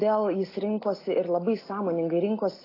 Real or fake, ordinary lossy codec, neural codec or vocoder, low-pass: real; AAC, 24 kbps; none; 5.4 kHz